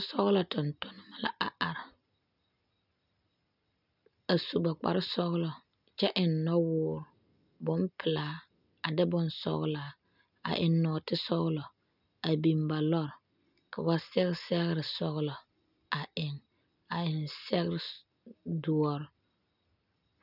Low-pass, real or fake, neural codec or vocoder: 5.4 kHz; real; none